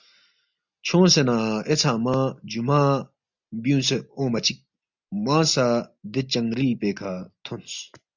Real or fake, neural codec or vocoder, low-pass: real; none; 7.2 kHz